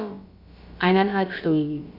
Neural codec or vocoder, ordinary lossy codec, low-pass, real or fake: codec, 16 kHz, about 1 kbps, DyCAST, with the encoder's durations; none; 5.4 kHz; fake